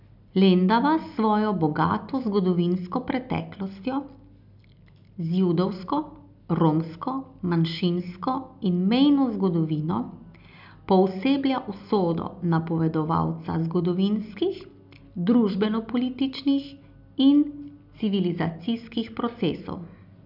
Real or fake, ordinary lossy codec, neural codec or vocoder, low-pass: real; none; none; 5.4 kHz